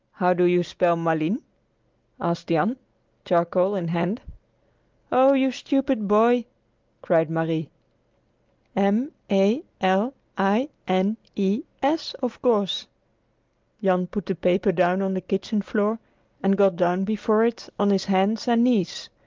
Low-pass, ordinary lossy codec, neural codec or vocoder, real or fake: 7.2 kHz; Opus, 32 kbps; none; real